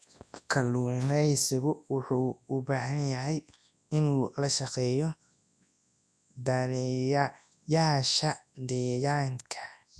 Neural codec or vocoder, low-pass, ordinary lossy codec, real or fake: codec, 24 kHz, 0.9 kbps, WavTokenizer, large speech release; none; none; fake